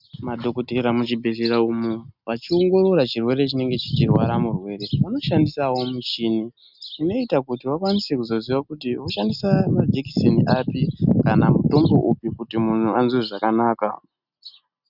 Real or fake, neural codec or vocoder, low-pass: real; none; 5.4 kHz